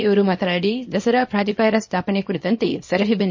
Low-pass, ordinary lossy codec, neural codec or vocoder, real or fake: 7.2 kHz; MP3, 32 kbps; codec, 24 kHz, 0.9 kbps, WavTokenizer, small release; fake